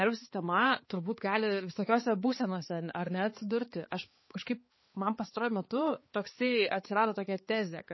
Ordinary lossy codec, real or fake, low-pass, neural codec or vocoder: MP3, 24 kbps; fake; 7.2 kHz; codec, 16 kHz, 4 kbps, X-Codec, HuBERT features, trained on balanced general audio